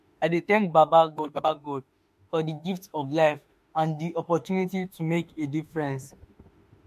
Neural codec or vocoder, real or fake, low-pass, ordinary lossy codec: autoencoder, 48 kHz, 32 numbers a frame, DAC-VAE, trained on Japanese speech; fake; 14.4 kHz; MP3, 64 kbps